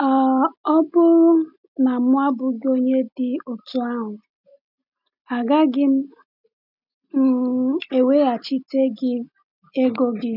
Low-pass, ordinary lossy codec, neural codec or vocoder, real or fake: 5.4 kHz; none; none; real